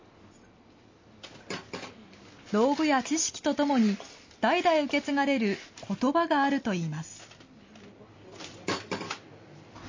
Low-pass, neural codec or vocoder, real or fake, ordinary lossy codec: 7.2 kHz; none; real; MP3, 32 kbps